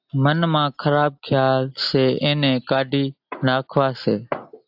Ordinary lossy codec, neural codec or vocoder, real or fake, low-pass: AAC, 48 kbps; none; real; 5.4 kHz